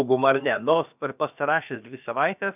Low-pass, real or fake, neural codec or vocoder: 3.6 kHz; fake; codec, 16 kHz, about 1 kbps, DyCAST, with the encoder's durations